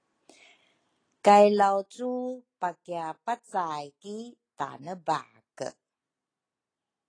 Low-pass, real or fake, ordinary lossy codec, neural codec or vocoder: 9.9 kHz; real; AAC, 32 kbps; none